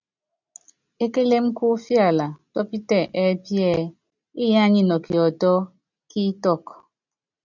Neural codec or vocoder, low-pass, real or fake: none; 7.2 kHz; real